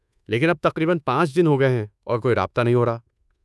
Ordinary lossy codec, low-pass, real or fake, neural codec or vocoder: none; none; fake; codec, 24 kHz, 1.2 kbps, DualCodec